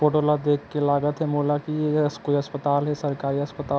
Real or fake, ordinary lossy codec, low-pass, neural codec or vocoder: real; none; none; none